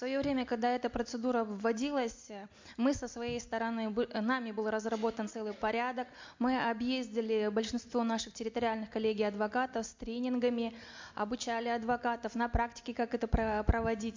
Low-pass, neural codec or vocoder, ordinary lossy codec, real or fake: 7.2 kHz; none; MP3, 48 kbps; real